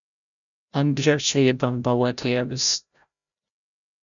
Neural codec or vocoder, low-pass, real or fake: codec, 16 kHz, 0.5 kbps, FreqCodec, larger model; 7.2 kHz; fake